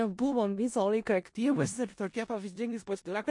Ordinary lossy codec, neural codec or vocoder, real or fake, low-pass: MP3, 48 kbps; codec, 16 kHz in and 24 kHz out, 0.4 kbps, LongCat-Audio-Codec, four codebook decoder; fake; 10.8 kHz